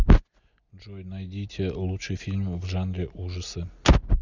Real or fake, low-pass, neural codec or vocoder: real; 7.2 kHz; none